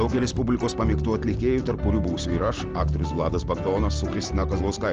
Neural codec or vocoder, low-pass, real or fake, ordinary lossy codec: none; 7.2 kHz; real; Opus, 16 kbps